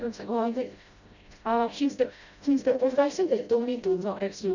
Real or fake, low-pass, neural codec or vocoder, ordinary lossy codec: fake; 7.2 kHz; codec, 16 kHz, 0.5 kbps, FreqCodec, smaller model; none